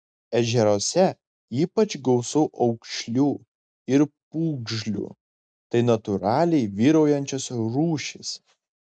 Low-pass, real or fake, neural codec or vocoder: 9.9 kHz; real; none